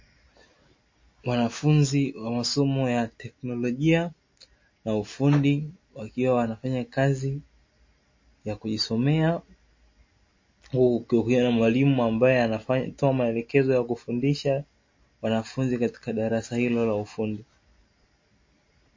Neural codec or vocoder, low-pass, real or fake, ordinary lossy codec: none; 7.2 kHz; real; MP3, 32 kbps